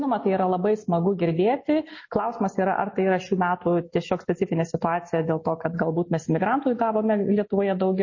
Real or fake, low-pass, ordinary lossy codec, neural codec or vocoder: real; 7.2 kHz; MP3, 32 kbps; none